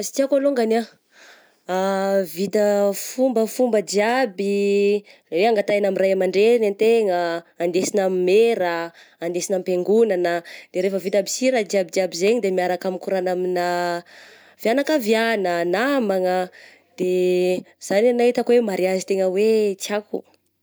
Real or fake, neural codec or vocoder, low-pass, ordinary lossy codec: real; none; none; none